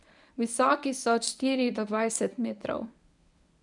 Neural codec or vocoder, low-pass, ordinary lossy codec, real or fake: codec, 24 kHz, 0.9 kbps, WavTokenizer, medium speech release version 1; 10.8 kHz; none; fake